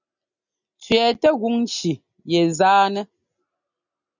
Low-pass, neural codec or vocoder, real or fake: 7.2 kHz; none; real